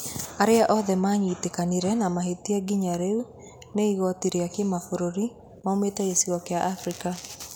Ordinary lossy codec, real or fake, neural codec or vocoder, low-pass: none; real; none; none